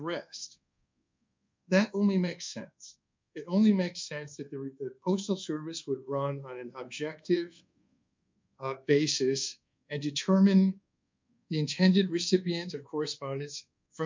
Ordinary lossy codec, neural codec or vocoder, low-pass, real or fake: MP3, 64 kbps; codec, 24 kHz, 1.2 kbps, DualCodec; 7.2 kHz; fake